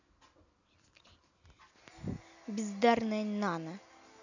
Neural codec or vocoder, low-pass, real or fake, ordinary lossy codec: none; 7.2 kHz; real; none